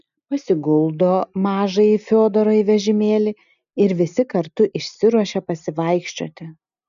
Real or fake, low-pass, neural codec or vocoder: real; 7.2 kHz; none